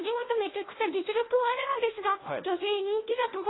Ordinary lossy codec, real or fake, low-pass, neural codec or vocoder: AAC, 16 kbps; fake; 7.2 kHz; codec, 16 kHz, 1 kbps, FunCodec, trained on LibriTTS, 50 frames a second